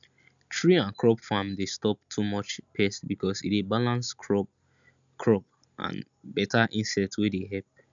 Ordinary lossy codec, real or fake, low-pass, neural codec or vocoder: none; real; 7.2 kHz; none